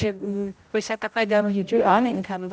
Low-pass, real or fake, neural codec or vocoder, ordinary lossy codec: none; fake; codec, 16 kHz, 0.5 kbps, X-Codec, HuBERT features, trained on general audio; none